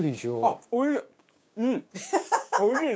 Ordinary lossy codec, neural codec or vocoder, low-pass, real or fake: none; codec, 16 kHz, 6 kbps, DAC; none; fake